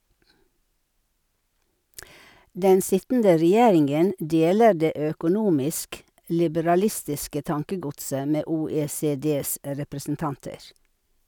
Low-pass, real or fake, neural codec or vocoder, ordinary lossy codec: none; real; none; none